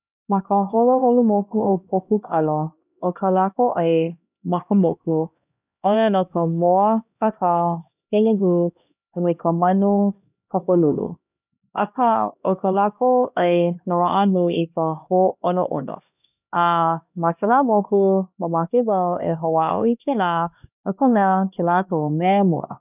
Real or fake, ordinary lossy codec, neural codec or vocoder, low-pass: fake; none; codec, 16 kHz, 1 kbps, X-Codec, HuBERT features, trained on LibriSpeech; 3.6 kHz